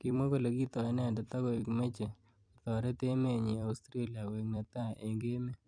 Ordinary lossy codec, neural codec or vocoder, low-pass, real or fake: MP3, 96 kbps; vocoder, 44.1 kHz, 128 mel bands every 256 samples, BigVGAN v2; 9.9 kHz; fake